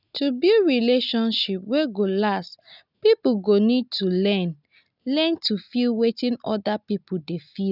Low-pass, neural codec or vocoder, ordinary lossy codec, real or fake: 5.4 kHz; none; none; real